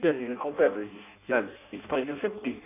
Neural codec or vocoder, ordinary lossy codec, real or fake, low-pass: codec, 16 kHz in and 24 kHz out, 0.6 kbps, FireRedTTS-2 codec; none; fake; 3.6 kHz